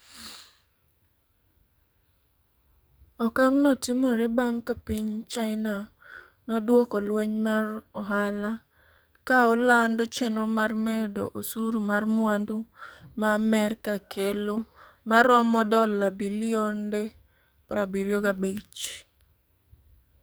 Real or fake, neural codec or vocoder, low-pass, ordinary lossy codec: fake; codec, 44.1 kHz, 2.6 kbps, SNAC; none; none